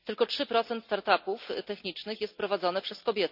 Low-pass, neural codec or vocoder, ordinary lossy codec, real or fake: 5.4 kHz; none; none; real